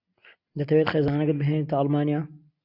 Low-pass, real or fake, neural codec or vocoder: 5.4 kHz; real; none